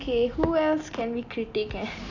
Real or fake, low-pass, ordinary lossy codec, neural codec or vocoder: real; 7.2 kHz; none; none